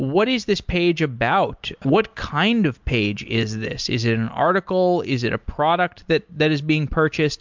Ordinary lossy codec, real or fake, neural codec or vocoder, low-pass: MP3, 64 kbps; real; none; 7.2 kHz